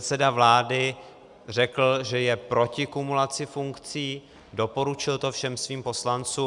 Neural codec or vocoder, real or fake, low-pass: none; real; 10.8 kHz